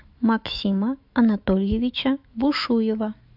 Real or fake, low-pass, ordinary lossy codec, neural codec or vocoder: fake; 5.4 kHz; AAC, 48 kbps; autoencoder, 48 kHz, 128 numbers a frame, DAC-VAE, trained on Japanese speech